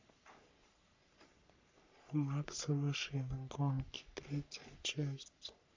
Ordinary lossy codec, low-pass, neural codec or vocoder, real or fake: MP3, 64 kbps; 7.2 kHz; codec, 44.1 kHz, 3.4 kbps, Pupu-Codec; fake